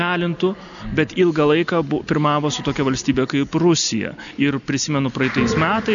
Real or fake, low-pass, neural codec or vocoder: real; 7.2 kHz; none